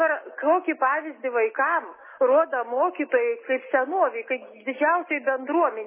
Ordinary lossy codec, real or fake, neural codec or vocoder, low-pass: MP3, 16 kbps; real; none; 3.6 kHz